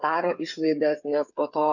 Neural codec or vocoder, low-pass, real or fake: codec, 16 kHz, 8 kbps, FreqCodec, smaller model; 7.2 kHz; fake